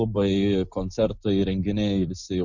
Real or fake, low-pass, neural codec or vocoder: real; 7.2 kHz; none